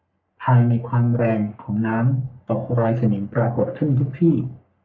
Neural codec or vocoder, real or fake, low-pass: codec, 44.1 kHz, 3.4 kbps, Pupu-Codec; fake; 7.2 kHz